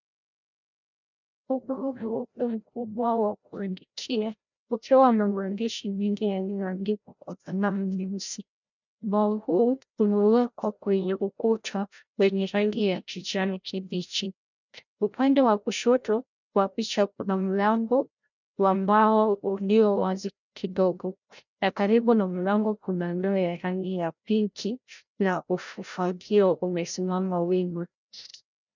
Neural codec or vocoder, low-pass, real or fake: codec, 16 kHz, 0.5 kbps, FreqCodec, larger model; 7.2 kHz; fake